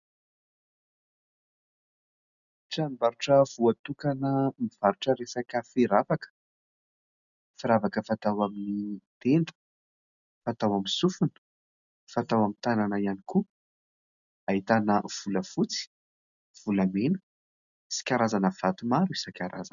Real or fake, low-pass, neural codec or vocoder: real; 7.2 kHz; none